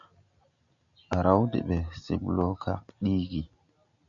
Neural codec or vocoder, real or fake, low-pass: none; real; 7.2 kHz